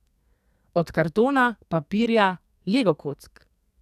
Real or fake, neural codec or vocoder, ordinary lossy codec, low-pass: fake; codec, 44.1 kHz, 2.6 kbps, SNAC; none; 14.4 kHz